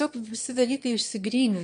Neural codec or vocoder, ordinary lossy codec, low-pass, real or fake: autoencoder, 22.05 kHz, a latent of 192 numbers a frame, VITS, trained on one speaker; MP3, 64 kbps; 9.9 kHz; fake